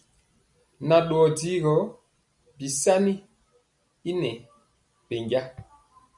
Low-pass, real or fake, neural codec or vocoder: 10.8 kHz; real; none